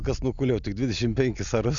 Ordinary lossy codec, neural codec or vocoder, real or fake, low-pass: MP3, 96 kbps; none; real; 7.2 kHz